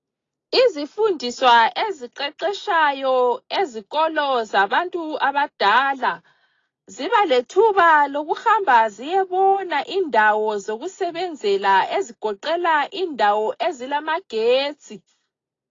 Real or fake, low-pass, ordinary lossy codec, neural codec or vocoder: real; 7.2 kHz; AAC, 32 kbps; none